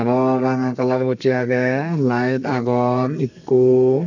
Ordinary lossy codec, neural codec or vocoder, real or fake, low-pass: none; codec, 32 kHz, 1.9 kbps, SNAC; fake; 7.2 kHz